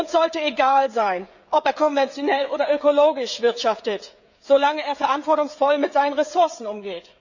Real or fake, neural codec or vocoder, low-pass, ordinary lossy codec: fake; codec, 16 kHz, 16 kbps, FreqCodec, smaller model; 7.2 kHz; none